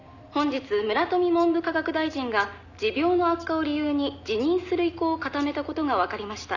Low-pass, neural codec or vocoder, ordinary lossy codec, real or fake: 7.2 kHz; none; none; real